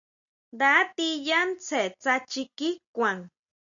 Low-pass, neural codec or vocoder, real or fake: 7.2 kHz; none; real